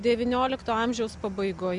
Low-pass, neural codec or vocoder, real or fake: 10.8 kHz; none; real